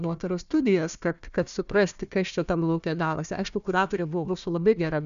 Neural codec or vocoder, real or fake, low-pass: codec, 16 kHz, 1 kbps, FunCodec, trained on Chinese and English, 50 frames a second; fake; 7.2 kHz